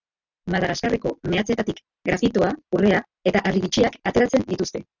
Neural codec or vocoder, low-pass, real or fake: none; 7.2 kHz; real